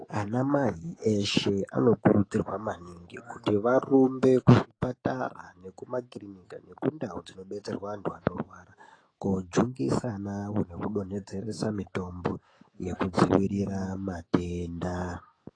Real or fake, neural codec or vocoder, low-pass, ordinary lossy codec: fake; autoencoder, 48 kHz, 128 numbers a frame, DAC-VAE, trained on Japanese speech; 9.9 kHz; AAC, 32 kbps